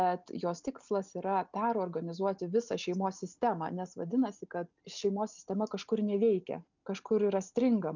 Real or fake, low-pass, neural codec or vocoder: real; 7.2 kHz; none